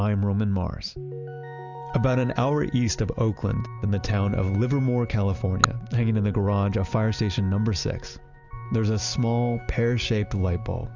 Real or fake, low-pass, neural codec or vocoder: real; 7.2 kHz; none